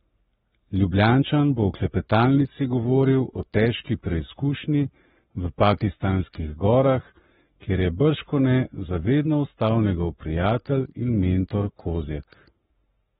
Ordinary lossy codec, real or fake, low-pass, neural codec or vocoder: AAC, 16 kbps; fake; 19.8 kHz; codec, 44.1 kHz, 7.8 kbps, Pupu-Codec